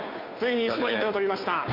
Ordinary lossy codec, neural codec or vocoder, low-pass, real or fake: MP3, 48 kbps; codec, 16 kHz, 2 kbps, FunCodec, trained on Chinese and English, 25 frames a second; 5.4 kHz; fake